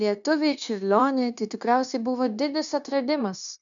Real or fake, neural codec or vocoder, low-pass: fake; codec, 16 kHz, 0.9 kbps, LongCat-Audio-Codec; 7.2 kHz